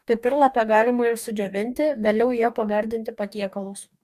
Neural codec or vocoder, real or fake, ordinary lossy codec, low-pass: codec, 44.1 kHz, 2.6 kbps, DAC; fake; AAC, 96 kbps; 14.4 kHz